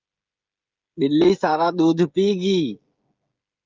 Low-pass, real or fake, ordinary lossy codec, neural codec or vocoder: 7.2 kHz; fake; Opus, 24 kbps; codec, 16 kHz, 16 kbps, FreqCodec, smaller model